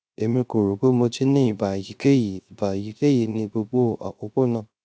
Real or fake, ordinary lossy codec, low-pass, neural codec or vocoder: fake; none; none; codec, 16 kHz, 0.3 kbps, FocalCodec